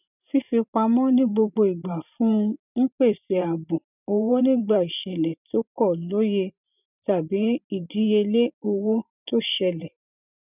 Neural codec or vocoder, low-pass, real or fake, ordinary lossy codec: vocoder, 44.1 kHz, 128 mel bands every 512 samples, BigVGAN v2; 3.6 kHz; fake; none